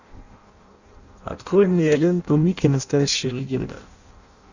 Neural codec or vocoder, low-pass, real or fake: codec, 16 kHz in and 24 kHz out, 0.6 kbps, FireRedTTS-2 codec; 7.2 kHz; fake